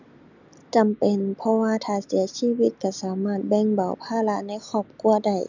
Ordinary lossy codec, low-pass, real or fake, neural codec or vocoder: none; 7.2 kHz; real; none